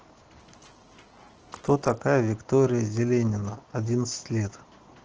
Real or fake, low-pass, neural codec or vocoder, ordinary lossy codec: real; 7.2 kHz; none; Opus, 16 kbps